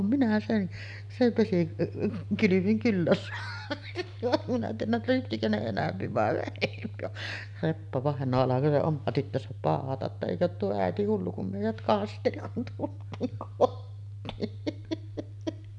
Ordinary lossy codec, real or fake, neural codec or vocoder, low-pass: none; real; none; 10.8 kHz